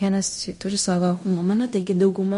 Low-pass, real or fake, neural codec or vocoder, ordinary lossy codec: 10.8 kHz; fake; codec, 16 kHz in and 24 kHz out, 0.9 kbps, LongCat-Audio-Codec, fine tuned four codebook decoder; MP3, 48 kbps